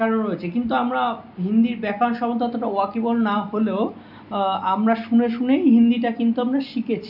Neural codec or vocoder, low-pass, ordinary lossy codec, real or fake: none; 5.4 kHz; none; real